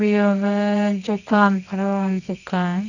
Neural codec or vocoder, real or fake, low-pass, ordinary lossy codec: codec, 24 kHz, 0.9 kbps, WavTokenizer, medium music audio release; fake; 7.2 kHz; none